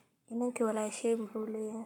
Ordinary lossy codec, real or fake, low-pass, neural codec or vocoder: none; fake; 19.8 kHz; codec, 44.1 kHz, 7.8 kbps, Pupu-Codec